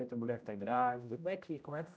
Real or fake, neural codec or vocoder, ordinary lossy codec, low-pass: fake; codec, 16 kHz, 0.5 kbps, X-Codec, HuBERT features, trained on general audio; none; none